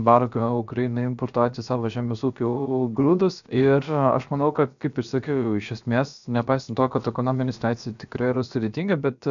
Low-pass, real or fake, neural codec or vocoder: 7.2 kHz; fake; codec, 16 kHz, about 1 kbps, DyCAST, with the encoder's durations